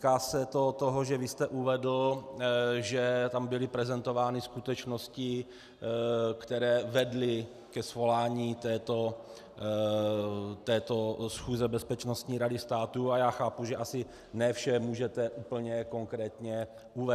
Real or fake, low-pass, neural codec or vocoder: real; 14.4 kHz; none